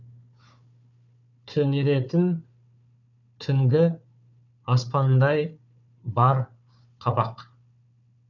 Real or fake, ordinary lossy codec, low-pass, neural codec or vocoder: fake; none; 7.2 kHz; codec, 16 kHz, 4 kbps, FunCodec, trained on Chinese and English, 50 frames a second